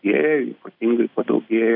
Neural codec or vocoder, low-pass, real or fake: none; 5.4 kHz; real